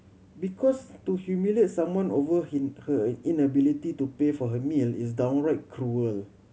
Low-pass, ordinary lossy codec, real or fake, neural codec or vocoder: none; none; real; none